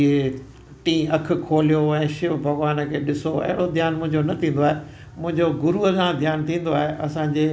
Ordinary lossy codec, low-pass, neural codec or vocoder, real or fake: none; none; none; real